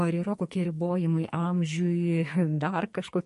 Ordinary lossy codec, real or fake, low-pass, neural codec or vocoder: MP3, 48 kbps; fake; 14.4 kHz; codec, 44.1 kHz, 2.6 kbps, SNAC